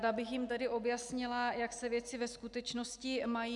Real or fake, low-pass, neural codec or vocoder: real; 10.8 kHz; none